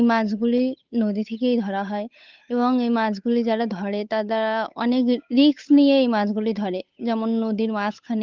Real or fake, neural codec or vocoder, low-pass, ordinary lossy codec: fake; codec, 16 kHz, 8 kbps, FunCodec, trained on Chinese and English, 25 frames a second; 7.2 kHz; Opus, 32 kbps